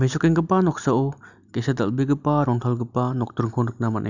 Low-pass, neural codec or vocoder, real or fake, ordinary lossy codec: 7.2 kHz; none; real; none